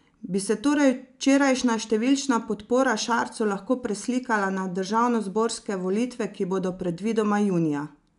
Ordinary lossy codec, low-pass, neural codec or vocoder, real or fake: none; 10.8 kHz; none; real